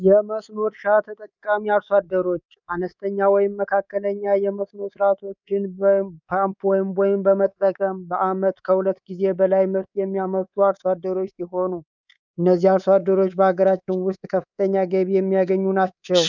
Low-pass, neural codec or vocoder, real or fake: 7.2 kHz; codec, 24 kHz, 3.1 kbps, DualCodec; fake